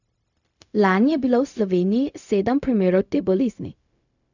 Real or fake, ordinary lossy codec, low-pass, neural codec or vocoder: fake; none; 7.2 kHz; codec, 16 kHz, 0.4 kbps, LongCat-Audio-Codec